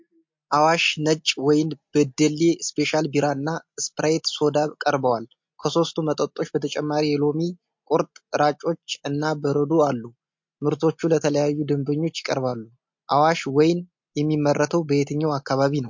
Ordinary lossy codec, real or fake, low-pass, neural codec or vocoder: MP3, 48 kbps; real; 7.2 kHz; none